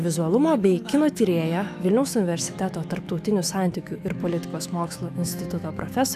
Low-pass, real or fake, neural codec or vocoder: 14.4 kHz; fake; autoencoder, 48 kHz, 128 numbers a frame, DAC-VAE, trained on Japanese speech